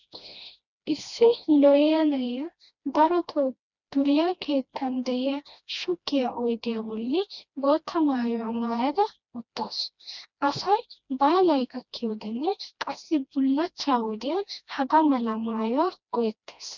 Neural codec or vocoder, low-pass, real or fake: codec, 16 kHz, 1 kbps, FreqCodec, smaller model; 7.2 kHz; fake